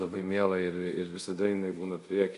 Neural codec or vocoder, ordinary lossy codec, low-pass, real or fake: codec, 24 kHz, 0.5 kbps, DualCodec; AAC, 96 kbps; 10.8 kHz; fake